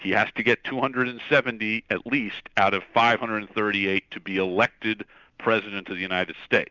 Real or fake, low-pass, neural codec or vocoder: real; 7.2 kHz; none